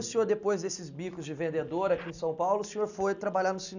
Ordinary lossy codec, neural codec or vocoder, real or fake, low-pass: none; none; real; 7.2 kHz